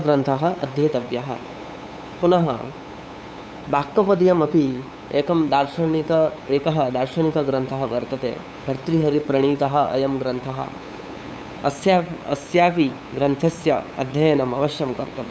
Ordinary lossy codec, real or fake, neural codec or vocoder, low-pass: none; fake; codec, 16 kHz, 8 kbps, FunCodec, trained on LibriTTS, 25 frames a second; none